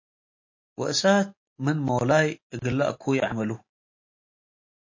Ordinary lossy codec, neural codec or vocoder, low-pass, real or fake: MP3, 32 kbps; none; 7.2 kHz; real